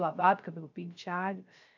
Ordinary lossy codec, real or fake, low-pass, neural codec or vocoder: none; fake; 7.2 kHz; codec, 16 kHz, 0.3 kbps, FocalCodec